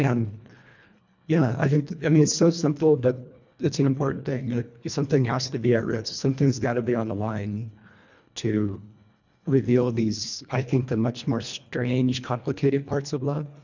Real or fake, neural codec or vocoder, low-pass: fake; codec, 24 kHz, 1.5 kbps, HILCodec; 7.2 kHz